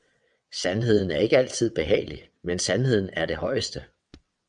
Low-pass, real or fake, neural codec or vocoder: 9.9 kHz; fake; vocoder, 22.05 kHz, 80 mel bands, WaveNeXt